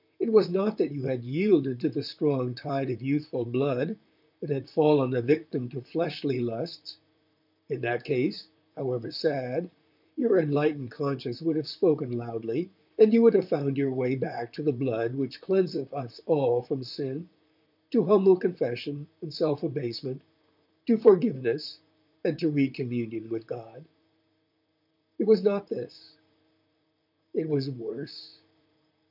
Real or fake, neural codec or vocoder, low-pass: fake; vocoder, 44.1 kHz, 128 mel bands, Pupu-Vocoder; 5.4 kHz